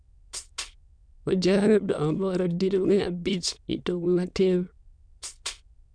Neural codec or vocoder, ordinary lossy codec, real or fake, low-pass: autoencoder, 22.05 kHz, a latent of 192 numbers a frame, VITS, trained on many speakers; MP3, 96 kbps; fake; 9.9 kHz